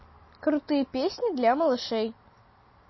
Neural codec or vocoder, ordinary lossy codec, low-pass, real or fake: none; MP3, 24 kbps; 7.2 kHz; real